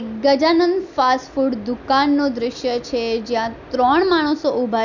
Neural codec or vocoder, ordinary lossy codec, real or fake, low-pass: none; none; real; 7.2 kHz